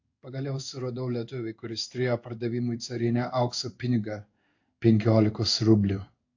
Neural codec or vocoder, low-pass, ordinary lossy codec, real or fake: codec, 16 kHz in and 24 kHz out, 1 kbps, XY-Tokenizer; 7.2 kHz; AAC, 48 kbps; fake